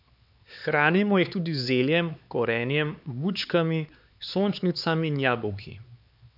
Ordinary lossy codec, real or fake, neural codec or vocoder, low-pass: none; fake; codec, 16 kHz, 4 kbps, X-Codec, HuBERT features, trained on LibriSpeech; 5.4 kHz